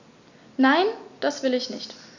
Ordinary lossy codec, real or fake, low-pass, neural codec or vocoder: none; real; 7.2 kHz; none